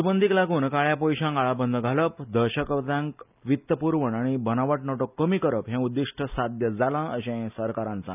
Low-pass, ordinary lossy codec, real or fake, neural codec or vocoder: 3.6 kHz; none; real; none